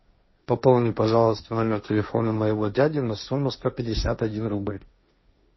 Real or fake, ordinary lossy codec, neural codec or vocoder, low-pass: fake; MP3, 24 kbps; codec, 16 kHz, 1.1 kbps, Voila-Tokenizer; 7.2 kHz